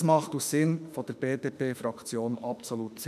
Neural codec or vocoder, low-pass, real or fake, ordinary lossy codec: autoencoder, 48 kHz, 32 numbers a frame, DAC-VAE, trained on Japanese speech; 14.4 kHz; fake; none